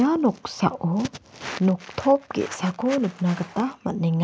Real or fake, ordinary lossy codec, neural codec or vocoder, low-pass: real; none; none; none